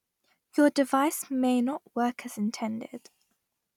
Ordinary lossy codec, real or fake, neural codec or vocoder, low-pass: none; real; none; 19.8 kHz